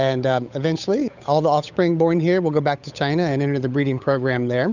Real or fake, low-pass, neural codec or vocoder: fake; 7.2 kHz; codec, 16 kHz, 8 kbps, FunCodec, trained on Chinese and English, 25 frames a second